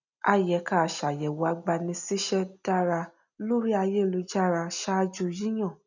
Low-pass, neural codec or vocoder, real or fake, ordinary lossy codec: 7.2 kHz; none; real; none